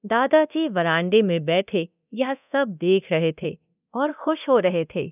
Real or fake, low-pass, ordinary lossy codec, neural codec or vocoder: fake; 3.6 kHz; none; codec, 24 kHz, 0.9 kbps, DualCodec